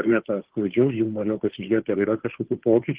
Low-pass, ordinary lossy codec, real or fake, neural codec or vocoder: 3.6 kHz; Opus, 16 kbps; fake; codec, 16 kHz, 2 kbps, FunCodec, trained on Chinese and English, 25 frames a second